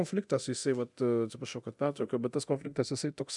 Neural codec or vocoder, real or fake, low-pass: codec, 24 kHz, 0.9 kbps, DualCodec; fake; 10.8 kHz